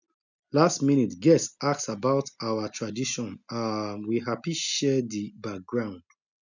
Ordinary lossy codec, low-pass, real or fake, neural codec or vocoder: none; 7.2 kHz; real; none